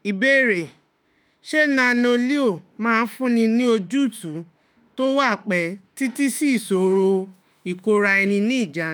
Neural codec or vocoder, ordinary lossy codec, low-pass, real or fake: autoencoder, 48 kHz, 32 numbers a frame, DAC-VAE, trained on Japanese speech; none; none; fake